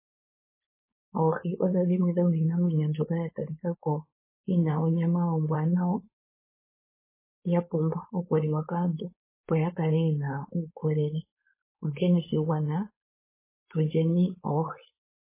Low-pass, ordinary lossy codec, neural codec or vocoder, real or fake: 3.6 kHz; MP3, 16 kbps; codec, 16 kHz, 4.8 kbps, FACodec; fake